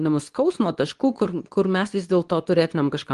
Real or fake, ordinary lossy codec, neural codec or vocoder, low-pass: fake; Opus, 24 kbps; codec, 24 kHz, 0.9 kbps, WavTokenizer, medium speech release version 2; 10.8 kHz